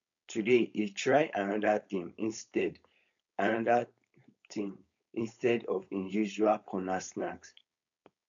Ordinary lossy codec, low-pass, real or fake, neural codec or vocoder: MP3, 64 kbps; 7.2 kHz; fake; codec, 16 kHz, 4.8 kbps, FACodec